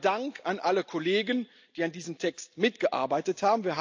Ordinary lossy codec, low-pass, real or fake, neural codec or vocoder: AAC, 48 kbps; 7.2 kHz; real; none